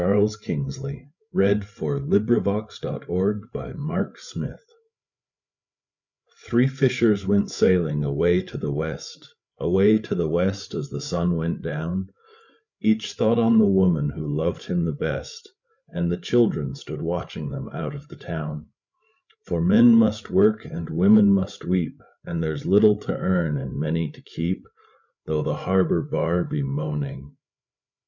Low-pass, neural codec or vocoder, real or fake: 7.2 kHz; codec, 16 kHz, 8 kbps, FreqCodec, larger model; fake